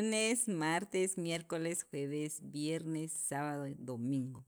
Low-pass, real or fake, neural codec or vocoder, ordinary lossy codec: none; real; none; none